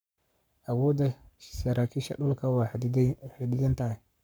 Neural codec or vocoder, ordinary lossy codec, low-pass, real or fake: codec, 44.1 kHz, 7.8 kbps, Pupu-Codec; none; none; fake